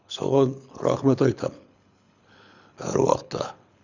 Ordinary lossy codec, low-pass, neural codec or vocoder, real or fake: none; 7.2 kHz; codec, 24 kHz, 6 kbps, HILCodec; fake